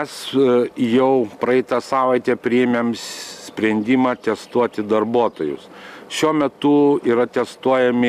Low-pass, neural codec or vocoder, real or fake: 14.4 kHz; none; real